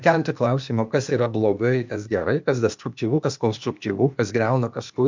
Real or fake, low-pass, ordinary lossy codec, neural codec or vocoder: fake; 7.2 kHz; MP3, 64 kbps; codec, 16 kHz, 0.8 kbps, ZipCodec